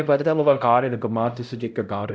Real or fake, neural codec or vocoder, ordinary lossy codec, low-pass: fake; codec, 16 kHz, 0.5 kbps, X-Codec, HuBERT features, trained on LibriSpeech; none; none